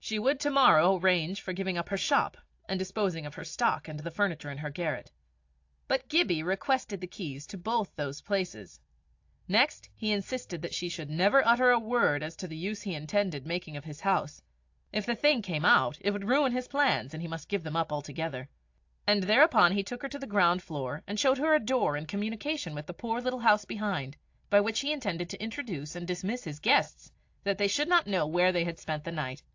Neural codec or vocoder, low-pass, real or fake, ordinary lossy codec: none; 7.2 kHz; real; AAC, 48 kbps